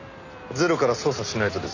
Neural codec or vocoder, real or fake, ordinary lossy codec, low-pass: none; real; none; 7.2 kHz